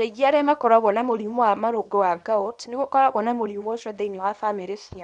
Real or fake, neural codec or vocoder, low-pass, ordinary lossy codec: fake; codec, 24 kHz, 0.9 kbps, WavTokenizer, small release; 10.8 kHz; none